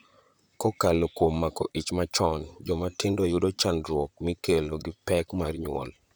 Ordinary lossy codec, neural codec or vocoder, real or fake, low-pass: none; vocoder, 44.1 kHz, 128 mel bands, Pupu-Vocoder; fake; none